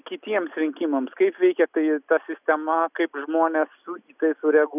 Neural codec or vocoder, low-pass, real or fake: none; 3.6 kHz; real